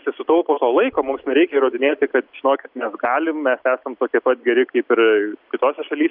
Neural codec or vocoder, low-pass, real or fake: none; 5.4 kHz; real